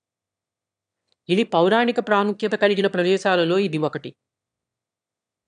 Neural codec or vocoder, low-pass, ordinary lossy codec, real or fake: autoencoder, 22.05 kHz, a latent of 192 numbers a frame, VITS, trained on one speaker; 9.9 kHz; none; fake